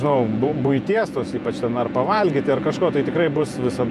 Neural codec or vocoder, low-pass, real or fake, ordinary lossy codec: vocoder, 48 kHz, 128 mel bands, Vocos; 14.4 kHz; fake; MP3, 96 kbps